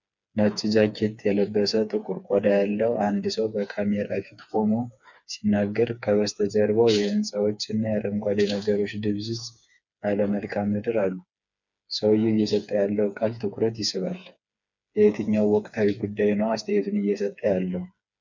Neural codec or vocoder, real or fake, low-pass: codec, 16 kHz, 4 kbps, FreqCodec, smaller model; fake; 7.2 kHz